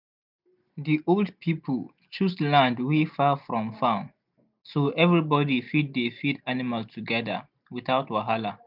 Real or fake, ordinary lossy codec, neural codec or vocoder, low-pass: real; none; none; 5.4 kHz